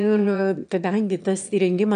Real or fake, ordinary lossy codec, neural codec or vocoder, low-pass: fake; MP3, 96 kbps; autoencoder, 22.05 kHz, a latent of 192 numbers a frame, VITS, trained on one speaker; 9.9 kHz